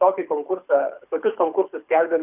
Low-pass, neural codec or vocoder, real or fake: 3.6 kHz; codec, 24 kHz, 6 kbps, HILCodec; fake